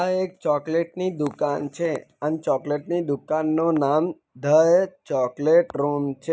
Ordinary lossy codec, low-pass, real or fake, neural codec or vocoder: none; none; real; none